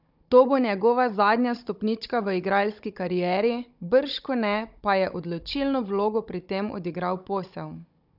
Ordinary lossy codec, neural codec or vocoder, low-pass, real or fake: none; codec, 16 kHz, 16 kbps, FunCodec, trained on Chinese and English, 50 frames a second; 5.4 kHz; fake